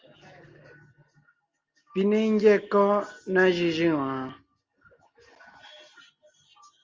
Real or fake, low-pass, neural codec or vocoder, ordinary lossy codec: real; 7.2 kHz; none; Opus, 32 kbps